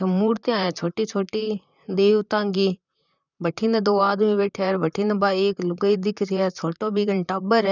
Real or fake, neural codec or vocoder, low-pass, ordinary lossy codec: fake; vocoder, 44.1 kHz, 128 mel bands, Pupu-Vocoder; 7.2 kHz; none